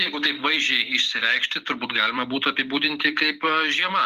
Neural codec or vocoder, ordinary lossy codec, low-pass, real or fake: vocoder, 44.1 kHz, 128 mel bands every 256 samples, BigVGAN v2; Opus, 32 kbps; 14.4 kHz; fake